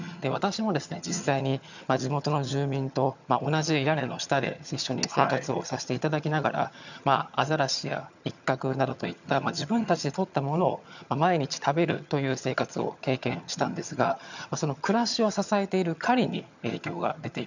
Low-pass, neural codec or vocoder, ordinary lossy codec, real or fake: 7.2 kHz; vocoder, 22.05 kHz, 80 mel bands, HiFi-GAN; none; fake